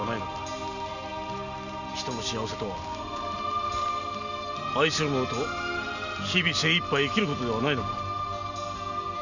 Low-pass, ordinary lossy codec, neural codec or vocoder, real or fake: 7.2 kHz; none; none; real